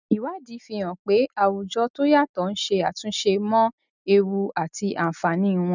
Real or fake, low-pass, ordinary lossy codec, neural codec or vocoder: real; 7.2 kHz; none; none